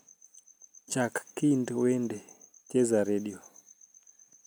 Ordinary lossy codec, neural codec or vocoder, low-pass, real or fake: none; none; none; real